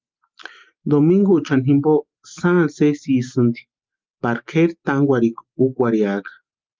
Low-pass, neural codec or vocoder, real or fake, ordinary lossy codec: 7.2 kHz; none; real; Opus, 32 kbps